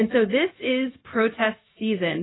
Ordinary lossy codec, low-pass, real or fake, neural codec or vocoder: AAC, 16 kbps; 7.2 kHz; fake; codec, 16 kHz, 0.4 kbps, LongCat-Audio-Codec